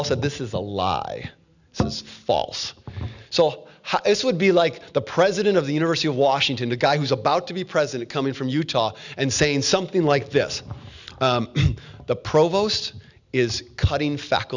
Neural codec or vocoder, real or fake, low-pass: none; real; 7.2 kHz